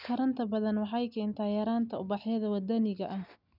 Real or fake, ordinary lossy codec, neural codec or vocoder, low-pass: real; none; none; 5.4 kHz